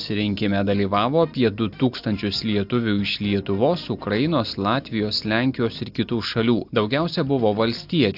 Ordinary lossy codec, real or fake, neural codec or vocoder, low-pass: AAC, 48 kbps; real; none; 5.4 kHz